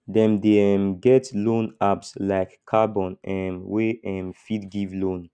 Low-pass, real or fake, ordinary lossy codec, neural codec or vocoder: 9.9 kHz; real; none; none